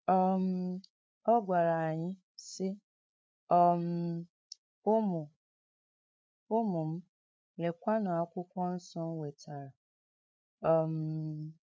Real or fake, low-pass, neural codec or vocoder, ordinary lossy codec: fake; none; codec, 16 kHz, 8 kbps, FreqCodec, larger model; none